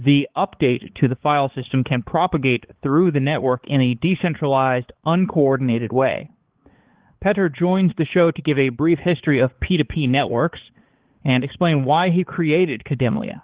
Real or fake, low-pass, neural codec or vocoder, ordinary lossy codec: fake; 3.6 kHz; codec, 16 kHz, 4 kbps, X-Codec, HuBERT features, trained on balanced general audio; Opus, 16 kbps